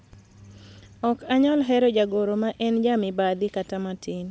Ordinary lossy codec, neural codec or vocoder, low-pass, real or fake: none; none; none; real